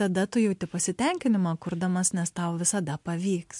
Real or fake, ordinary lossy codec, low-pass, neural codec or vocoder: real; MP3, 64 kbps; 10.8 kHz; none